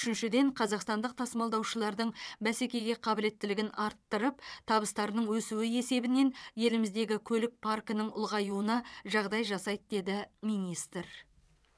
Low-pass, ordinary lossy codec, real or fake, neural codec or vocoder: none; none; fake; vocoder, 22.05 kHz, 80 mel bands, WaveNeXt